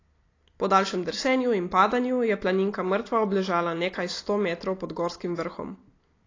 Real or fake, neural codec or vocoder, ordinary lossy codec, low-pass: real; none; AAC, 32 kbps; 7.2 kHz